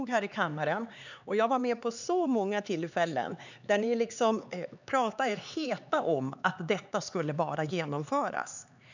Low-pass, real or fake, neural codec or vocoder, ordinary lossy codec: 7.2 kHz; fake; codec, 16 kHz, 4 kbps, X-Codec, HuBERT features, trained on LibriSpeech; none